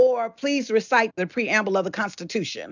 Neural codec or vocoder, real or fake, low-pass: none; real; 7.2 kHz